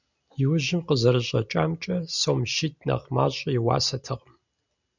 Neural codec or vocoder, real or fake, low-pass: none; real; 7.2 kHz